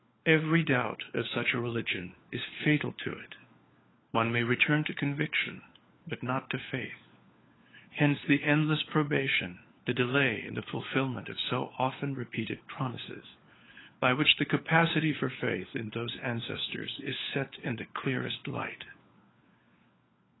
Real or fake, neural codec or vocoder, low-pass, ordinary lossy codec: fake; codec, 16 kHz, 4 kbps, FunCodec, trained on LibriTTS, 50 frames a second; 7.2 kHz; AAC, 16 kbps